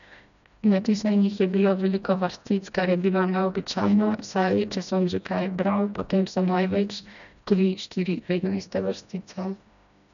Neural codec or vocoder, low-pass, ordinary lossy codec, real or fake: codec, 16 kHz, 1 kbps, FreqCodec, smaller model; 7.2 kHz; none; fake